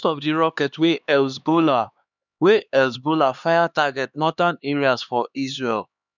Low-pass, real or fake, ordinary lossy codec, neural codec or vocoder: 7.2 kHz; fake; none; codec, 16 kHz, 2 kbps, X-Codec, HuBERT features, trained on LibriSpeech